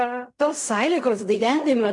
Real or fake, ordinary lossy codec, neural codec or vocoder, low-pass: fake; MP3, 64 kbps; codec, 16 kHz in and 24 kHz out, 0.4 kbps, LongCat-Audio-Codec, fine tuned four codebook decoder; 10.8 kHz